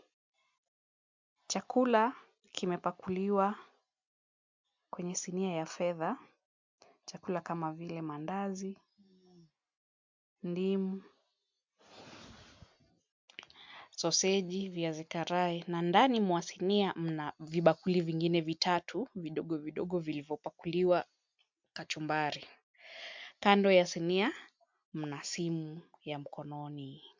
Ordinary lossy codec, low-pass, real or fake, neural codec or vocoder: MP3, 64 kbps; 7.2 kHz; real; none